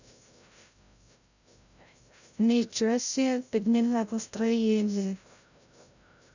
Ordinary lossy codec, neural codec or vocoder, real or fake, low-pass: none; codec, 16 kHz, 0.5 kbps, FreqCodec, larger model; fake; 7.2 kHz